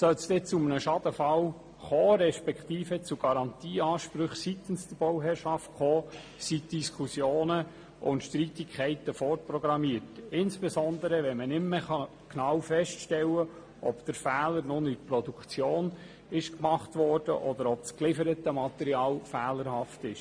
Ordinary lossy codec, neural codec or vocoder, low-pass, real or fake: MP3, 48 kbps; none; 9.9 kHz; real